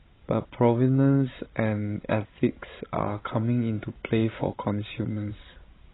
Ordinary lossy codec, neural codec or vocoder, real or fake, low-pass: AAC, 16 kbps; codec, 16 kHz, 16 kbps, FreqCodec, larger model; fake; 7.2 kHz